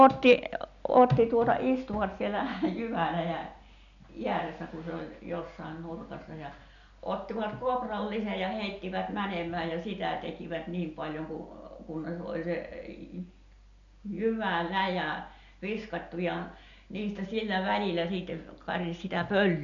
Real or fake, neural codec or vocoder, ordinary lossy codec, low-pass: real; none; none; 7.2 kHz